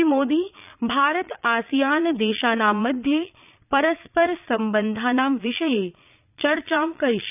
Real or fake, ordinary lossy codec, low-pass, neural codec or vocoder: fake; none; 3.6 kHz; vocoder, 22.05 kHz, 80 mel bands, Vocos